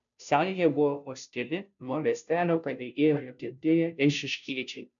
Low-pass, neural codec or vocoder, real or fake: 7.2 kHz; codec, 16 kHz, 0.5 kbps, FunCodec, trained on Chinese and English, 25 frames a second; fake